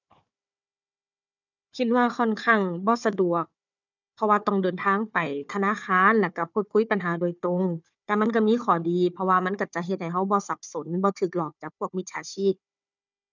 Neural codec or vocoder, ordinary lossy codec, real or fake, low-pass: codec, 16 kHz, 4 kbps, FunCodec, trained on Chinese and English, 50 frames a second; none; fake; 7.2 kHz